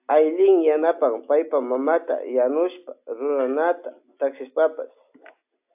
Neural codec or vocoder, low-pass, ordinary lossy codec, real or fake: none; 3.6 kHz; AAC, 32 kbps; real